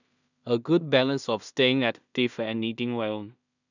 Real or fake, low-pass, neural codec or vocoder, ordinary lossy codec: fake; 7.2 kHz; codec, 16 kHz in and 24 kHz out, 0.4 kbps, LongCat-Audio-Codec, two codebook decoder; none